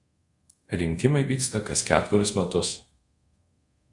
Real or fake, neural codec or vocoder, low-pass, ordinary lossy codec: fake; codec, 24 kHz, 0.5 kbps, DualCodec; 10.8 kHz; Opus, 64 kbps